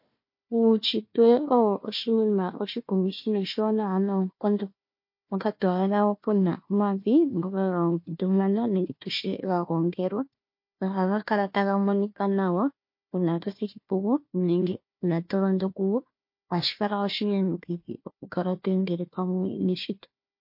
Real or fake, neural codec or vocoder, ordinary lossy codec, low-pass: fake; codec, 16 kHz, 1 kbps, FunCodec, trained on Chinese and English, 50 frames a second; MP3, 32 kbps; 5.4 kHz